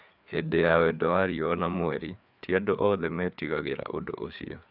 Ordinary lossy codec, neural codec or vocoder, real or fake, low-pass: none; codec, 16 kHz, 4 kbps, FreqCodec, larger model; fake; 5.4 kHz